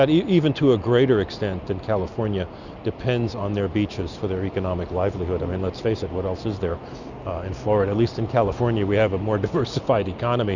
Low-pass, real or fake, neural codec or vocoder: 7.2 kHz; real; none